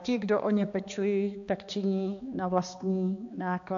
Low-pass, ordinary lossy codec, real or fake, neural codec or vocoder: 7.2 kHz; AAC, 64 kbps; fake; codec, 16 kHz, 2 kbps, X-Codec, HuBERT features, trained on balanced general audio